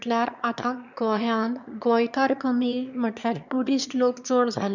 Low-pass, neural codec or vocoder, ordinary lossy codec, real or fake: 7.2 kHz; autoencoder, 22.05 kHz, a latent of 192 numbers a frame, VITS, trained on one speaker; none; fake